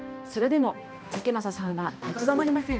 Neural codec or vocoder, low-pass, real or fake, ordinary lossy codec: codec, 16 kHz, 1 kbps, X-Codec, HuBERT features, trained on balanced general audio; none; fake; none